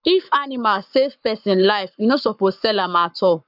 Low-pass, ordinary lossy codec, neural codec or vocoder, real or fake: 5.4 kHz; none; codec, 44.1 kHz, 7.8 kbps, Pupu-Codec; fake